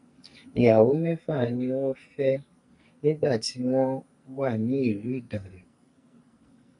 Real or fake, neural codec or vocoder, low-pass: fake; codec, 44.1 kHz, 2.6 kbps, SNAC; 10.8 kHz